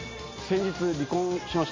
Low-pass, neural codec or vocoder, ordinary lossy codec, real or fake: 7.2 kHz; none; MP3, 32 kbps; real